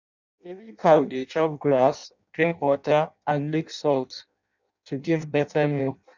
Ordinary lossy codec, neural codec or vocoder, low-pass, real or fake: none; codec, 16 kHz in and 24 kHz out, 0.6 kbps, FireRedTTS-2 codec; 7.2 kHz; fake